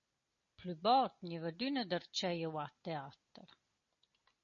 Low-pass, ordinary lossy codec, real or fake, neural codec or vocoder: 7.2 kHz; MP3, 32 kbps; real; none